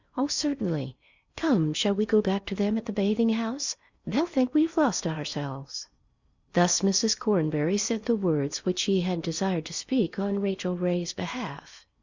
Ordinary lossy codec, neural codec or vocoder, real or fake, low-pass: Opus, 64 kbps; codec, 16 kHz in and 24 kHz out, 0.8 kbps, FocalCodec, streaming, 65536 codes; fake; 7.2 kHz